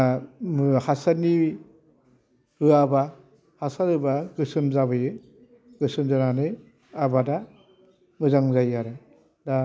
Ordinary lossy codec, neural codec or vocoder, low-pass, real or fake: none; none; none; real